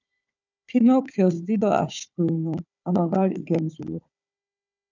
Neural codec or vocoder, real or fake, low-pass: codec, 16 kHz, 4 kbps, FunCodec, trained on Chinese and English, 50 frames a second; fake; 7.2 kHz